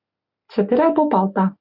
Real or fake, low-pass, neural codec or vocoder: real; 5.4 kHz; none